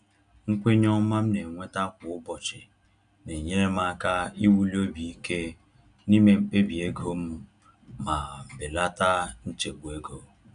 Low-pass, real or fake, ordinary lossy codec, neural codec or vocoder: 9.9 kHz; real; none; none